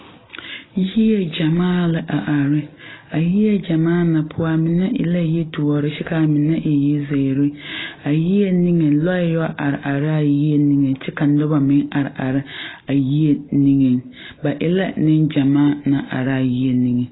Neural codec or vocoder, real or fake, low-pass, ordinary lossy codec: none; real; 7.2 kHz; AAC, 16 kbps